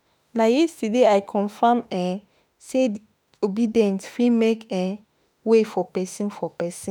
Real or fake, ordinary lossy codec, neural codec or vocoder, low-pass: fake; none; autoencoder, 48 kHz, 32 numbers a frame, DAC-VAE, trained on Japanese speech; none